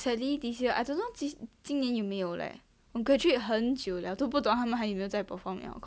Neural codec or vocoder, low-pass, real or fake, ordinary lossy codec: none; none; real; none